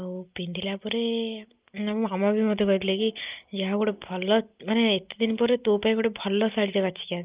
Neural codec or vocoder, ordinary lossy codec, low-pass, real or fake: none; Opus, 32 kbps; 3.6 kHz; real